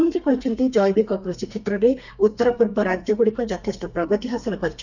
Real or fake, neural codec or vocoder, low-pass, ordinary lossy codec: fake; codec, 32 kHz, 1.9 kbps, SNAC; 7.2 kHz; none